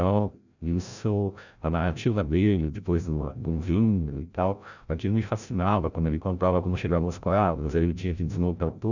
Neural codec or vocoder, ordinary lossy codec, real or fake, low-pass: codec, 16 kHz, 0.5 kbps, FreqCodec, larger model; none; fake; 7.2 kHz